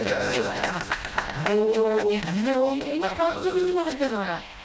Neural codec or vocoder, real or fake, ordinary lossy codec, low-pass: codec, 16 kHz, 0.5 kbps, FreqCodec, smaller model; fake; none; none